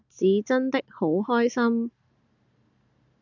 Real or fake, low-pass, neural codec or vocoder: real; 7.2 kHz; none